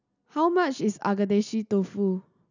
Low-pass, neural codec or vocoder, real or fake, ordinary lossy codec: 7.2 kHz; none; real; none